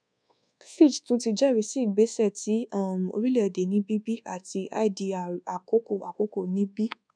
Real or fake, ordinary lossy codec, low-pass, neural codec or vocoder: fake; none; 9.9 kHz; codec, 24 kHz, 1.2 kbps, DualCodec